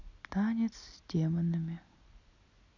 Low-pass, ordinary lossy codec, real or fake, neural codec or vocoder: 7.2 kHz; none; real; none